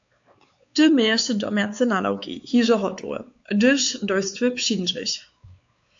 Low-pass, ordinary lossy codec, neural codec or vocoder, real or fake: 7.2 kHz; AAC, 64 kbps; codec, 16 kHz, 4 kbps, X-Codec, WavLM features, trained on Multilingual LibriSpeech; fake